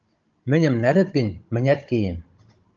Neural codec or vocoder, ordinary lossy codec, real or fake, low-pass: codec, 16 kHz, 16 kbps, FunCodec, trained on Chinese and English, 50 frames a second; Opus, 24 kbps; fake; 7.2 kHz